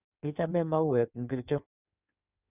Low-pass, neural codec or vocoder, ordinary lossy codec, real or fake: 3.6 kHz; codec, 16 kHz in and 24 kHz out, 1.1 kbps, FireRedTTS-2 codec; none; fake